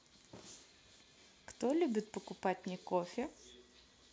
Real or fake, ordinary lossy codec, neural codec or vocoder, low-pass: real; none; none; none